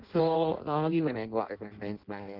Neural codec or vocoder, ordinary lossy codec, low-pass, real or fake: codec, 16 kHz in and 24 kHz out, 0.6 kbps, FireRedTTS-2 codec; Opus, 16 kbps; 5.4 kHz; fake